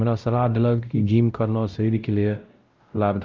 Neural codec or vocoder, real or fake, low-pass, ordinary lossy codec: codec, 16 kHz, 0.5 kbps, X-Codec, WavLM features, trained on Multilingual LibriSpeech; fake; 7.2 kHz; Opus, 32 kbps